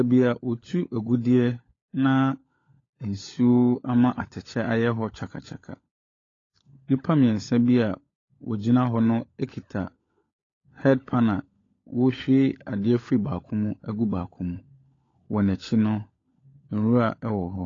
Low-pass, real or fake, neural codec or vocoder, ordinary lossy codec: 7.2 kHz; fake; codec, 16 kHz, 16 kbps, FunCodec, trained on LibriTTS, 50 frames a second; AAC, 32 kbps